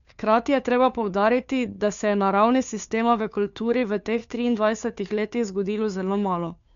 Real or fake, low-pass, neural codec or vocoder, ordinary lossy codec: fake; 7.2 kHz; codec, 16 kHz, 2 kbps, FunCodec, trained on Chinese and English, 25 frames a second; none